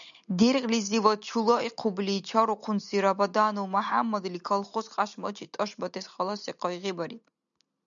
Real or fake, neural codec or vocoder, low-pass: real; none; 7.2 kHz